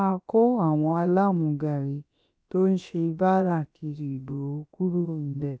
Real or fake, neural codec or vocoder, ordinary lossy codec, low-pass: fake; codec, 16 kHz, about 1 kbps, DyCAST, with the encoder's durations; none; none